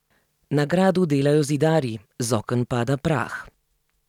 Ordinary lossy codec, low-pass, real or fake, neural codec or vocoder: none; 19.8 kHz; real; none